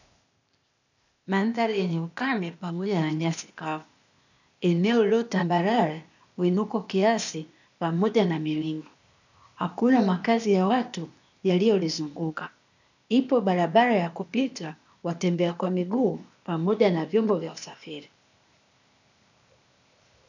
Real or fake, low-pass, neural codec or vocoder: fake; 7.2 kHz; codec, 16 kHz, 0.8 kbps, ZipCodec